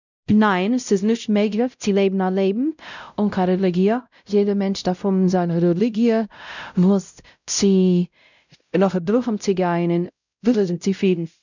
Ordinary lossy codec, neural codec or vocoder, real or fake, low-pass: none; codec, 16 kHz, 0.5 kbps, X-Codec, WavLM features, trained on Multilingual LibriSpeech; fake; 7.2 kHz